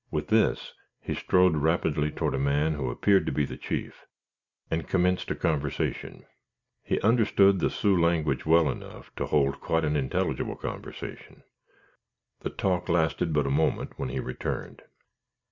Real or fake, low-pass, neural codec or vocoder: real; 7.2 kHz; none